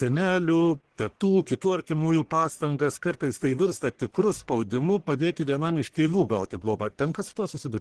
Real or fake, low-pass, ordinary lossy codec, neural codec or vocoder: fake; 10.8 kHz; Opus, 16 kbps; codec, 44.1 kHz, 1.7 kbps, Pupu-Codec